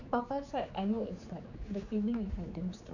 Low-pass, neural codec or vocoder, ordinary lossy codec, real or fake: 7.2 kHz; codec, 16 kHz, 4 kbps, X-Codec, HuBERT features, trained on general audio; none; fake